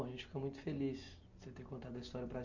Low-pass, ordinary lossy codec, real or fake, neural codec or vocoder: 7.2 kHz; none; real; none